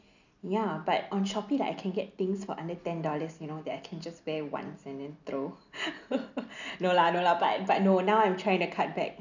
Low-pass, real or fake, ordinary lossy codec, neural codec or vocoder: 7.2 kHz; real; none; none